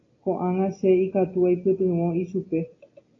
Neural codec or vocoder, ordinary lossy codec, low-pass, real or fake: none; AAC, 32 kbps; 7.2 kHz; real